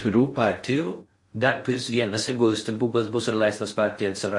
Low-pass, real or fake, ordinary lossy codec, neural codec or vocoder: 10.8 kHz; fake; MP3, 48 kbps; codec, 16 kHz in and 24 kHz out, 0.6 kbps, FocalCodec, streaming, 4096 codes